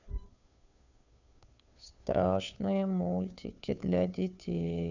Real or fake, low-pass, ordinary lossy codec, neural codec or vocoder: fake; 7.2 kHz; none; codec, 16 kHz, 2 kbps, FunCodec, trained on Chinese and English, 25 frames a second